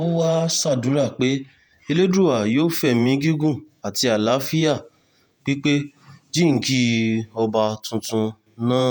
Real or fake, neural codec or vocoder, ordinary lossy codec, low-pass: fake; vocoder, 48 kHz, 128 mel bands, Vocos; none; none